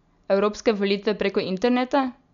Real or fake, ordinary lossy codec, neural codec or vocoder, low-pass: real; none; none; 7.2 kHz